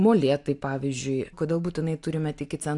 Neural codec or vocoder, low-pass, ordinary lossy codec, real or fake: none; 10.8 kHz; AAC, 64 kbps; real